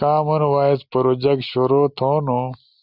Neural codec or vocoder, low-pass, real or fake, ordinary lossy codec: none; 5.4 kHz; real; Opus, 64 kbps